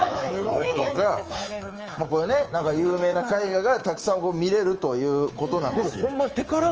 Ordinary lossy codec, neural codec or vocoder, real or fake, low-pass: Opus, 24 kbps; codec, 24 kHz, 3.1 kbps, DualCodec; fake; 7.2 kHz